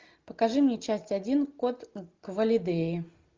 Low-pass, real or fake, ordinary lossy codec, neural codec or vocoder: 7.2 kHz; real; Opus, 32 kbps; none